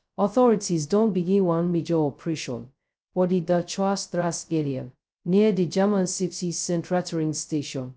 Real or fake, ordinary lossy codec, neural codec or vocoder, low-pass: fake; none; codec, 16 kHz, 0.2 kbps, FocalCodec; none